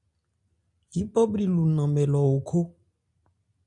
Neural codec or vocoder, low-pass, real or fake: none; 10.8 kHz; real